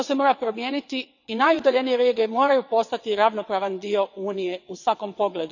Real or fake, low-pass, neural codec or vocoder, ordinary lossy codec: fake; 7.2 kHz; vocoder, 22.05 kHz, 80 mel bands, WaveNeXt; none